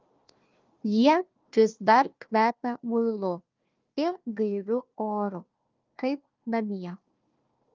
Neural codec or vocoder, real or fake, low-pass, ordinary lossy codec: codec, 16 kHz, 1 kbps, FunCodec, trained on Chinese and English, 50 frames a second; fake; 7.2 kHz; Opus, 24 kbps